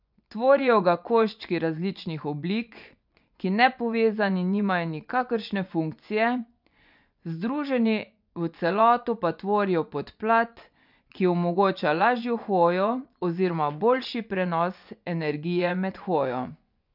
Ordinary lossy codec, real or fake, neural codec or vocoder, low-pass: none; fake; vocoder, 24 kHz, 100 mel bands, Vocos; 5.4 kHz